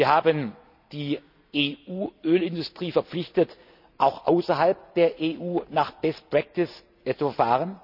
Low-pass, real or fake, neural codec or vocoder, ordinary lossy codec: 5.4 kHz; real; none; none